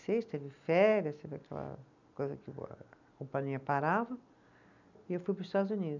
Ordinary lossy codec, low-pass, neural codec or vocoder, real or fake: none; 7.2 kHz; none; real